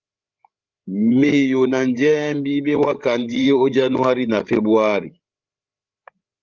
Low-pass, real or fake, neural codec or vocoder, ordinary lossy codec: 7.2 kHz; fake; codec, 16 kHz, 8 kbps, FreqCodec, larger model; Opus, 32 kbps